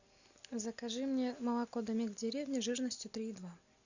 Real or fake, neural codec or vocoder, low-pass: real; none; 7.2 kHz